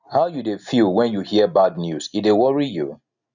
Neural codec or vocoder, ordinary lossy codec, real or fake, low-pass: none; none; real; 7.2 kHz